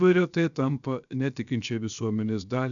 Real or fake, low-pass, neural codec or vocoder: fake; 7.2 kHz; codec, 16 kHz, 0.7 kbps, FocalCodec